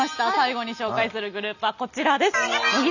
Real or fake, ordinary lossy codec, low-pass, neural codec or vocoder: real; none; 7.2 kHz; none